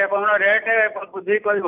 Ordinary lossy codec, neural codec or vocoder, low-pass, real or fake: none; none; 3.6 kHz; real